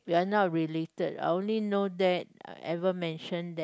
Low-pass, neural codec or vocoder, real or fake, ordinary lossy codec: none; none; real; none